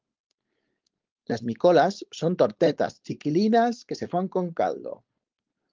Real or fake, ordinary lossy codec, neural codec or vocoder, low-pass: fake; Opus, 32 kbps; codec, 16 kHz, 4.8 kbps, FACodec; 7.2 kHz